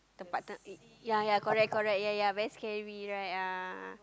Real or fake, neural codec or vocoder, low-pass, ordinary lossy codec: real; none; none; none